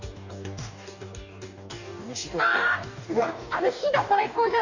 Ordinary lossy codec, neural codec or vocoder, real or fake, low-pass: none; codec, 44.1 kHz, 2.6 kbps, DAC; fake; 7.2 kHz